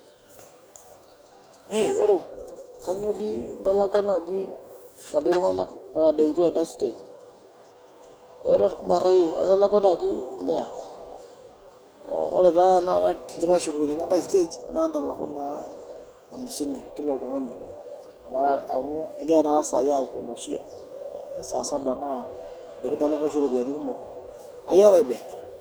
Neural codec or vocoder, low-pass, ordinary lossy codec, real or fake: codec, 44.1 kHz, 2.6 kbps, DAC; none; none; fake